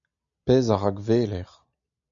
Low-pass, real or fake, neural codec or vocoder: 7.2 kHz; real; none